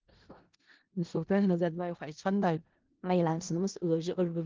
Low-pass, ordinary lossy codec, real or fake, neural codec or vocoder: 7.2 kHz; Opus, 16 kbps; fake; codec, 16 kHz in and 24 kHz out, 0.4 kbps, LongCat-Audio-Codec, four codebook decoder